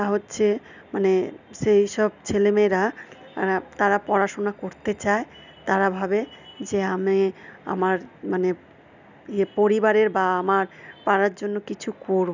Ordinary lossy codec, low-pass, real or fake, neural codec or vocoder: none; 7.2 kHz; real; none